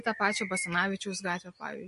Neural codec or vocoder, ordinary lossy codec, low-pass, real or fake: none; MP3, 48 kbps; 14.4 kHz; real